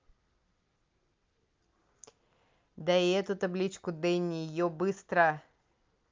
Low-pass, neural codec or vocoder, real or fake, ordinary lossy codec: 7.2 kHz; none; real; Opus, 24 kbps